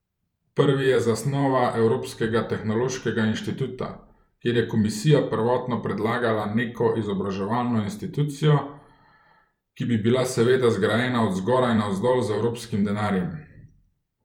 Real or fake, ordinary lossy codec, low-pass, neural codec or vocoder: fake; none; 19.8 kHz; vocoder, 44.1 kHz, 128 mel bands every 512 samples, BigVGAN v2